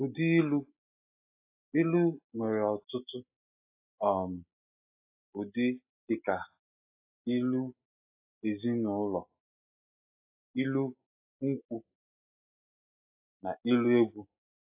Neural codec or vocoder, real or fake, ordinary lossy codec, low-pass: none; real; AAC, 32 kbps; 3.6 kHz